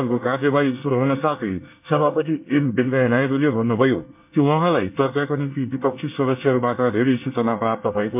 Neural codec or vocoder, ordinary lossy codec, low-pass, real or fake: codec, 24 kHz, 1 kbps, SNAC; MP3, 32 kbps; 3.6 kHz; fake